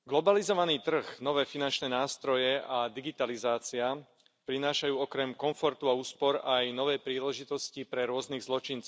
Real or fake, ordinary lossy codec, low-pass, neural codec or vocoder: real; none; none; none